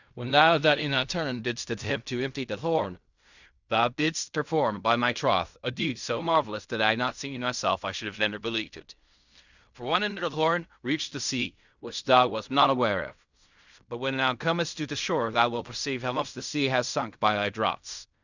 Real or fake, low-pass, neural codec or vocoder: fake; 7.2 kHz; codec, 16 kHz in and 24 kHz out, 0.4 kbps, LongCat-Audio-Codec, fine tuned four codebook decoder